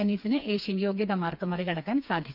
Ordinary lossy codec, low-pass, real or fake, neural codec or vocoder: none; 5.4 kHz; fake; codec, 16 kHz, 4 kbps, FreqCodec, smaller model